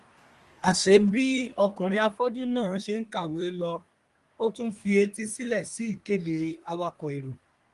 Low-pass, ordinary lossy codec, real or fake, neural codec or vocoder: 10.8 kHz; Opus, 32 kbps; fake; codec, 24 kHz, 1 kbps, SNAC